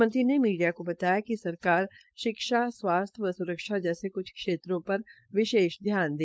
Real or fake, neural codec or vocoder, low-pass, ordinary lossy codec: fake; codec, 16 kHz, 4.8 kbps, FACodec; none; none